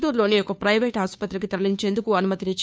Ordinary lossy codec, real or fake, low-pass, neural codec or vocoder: none; fake; none; codec, 16 kHz, 2 kbps, FunCodec, trained on Chinese and English, 25 frames a second